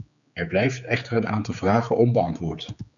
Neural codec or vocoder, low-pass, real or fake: codec, 16 kHz, 4 kbps, X-Codec, HuBERT features, trained on general audio; 7.2 kHz; fake